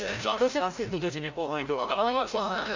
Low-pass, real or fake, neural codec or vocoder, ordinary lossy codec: 7.2 kHz; fake; codec, 16 kHz, 0.5 kbps, FreqCodec, larger model; MP3, 64 kbps